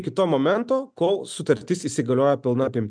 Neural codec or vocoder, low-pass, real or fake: none; 9.9 kHz; real